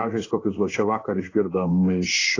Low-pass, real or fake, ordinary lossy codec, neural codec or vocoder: 7.2 kHz; fake; AAC, 32 kbps; codec, 16 kHz in and 24 kHz out, 1 kbps, XY-Tokenizer